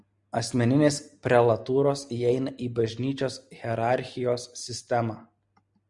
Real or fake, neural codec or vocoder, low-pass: real; none; 10.8 kHz